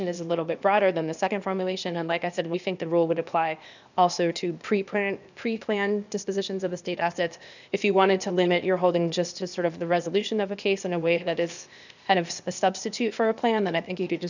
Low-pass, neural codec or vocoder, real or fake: 7.2 kHz; codec, 16 kHz, 0.8 kbps, ZipCodec; fake